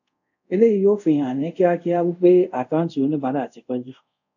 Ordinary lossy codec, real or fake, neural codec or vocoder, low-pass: none; fake; codec, 24 kHz, 0.5 kbps, DualCodec; 7.2 kHz